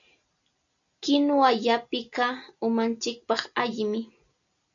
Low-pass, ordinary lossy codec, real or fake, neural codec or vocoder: 7.2 kHz; MP3, 96 kbps; real; none